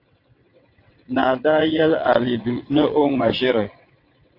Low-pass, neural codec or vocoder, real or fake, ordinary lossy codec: 5.4 kHz; vocoder, 22.05 kHz, 80 mel bands, WaveNeXt; fake; AAC, 32 kbps